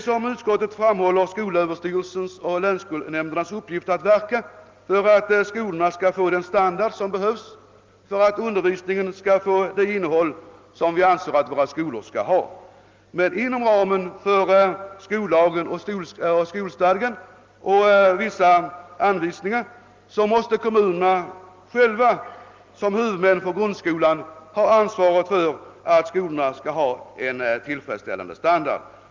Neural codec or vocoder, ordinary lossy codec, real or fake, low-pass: none; Opus, 32 kbps; real; 7.2 kHz